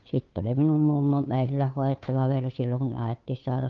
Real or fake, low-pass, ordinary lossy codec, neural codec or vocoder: fake; 7.2 kHz; Opus, 24 kbps; codec, 16 kHz, 2 kbps, FunCodec, trained on Chinese and English, 25 frames a second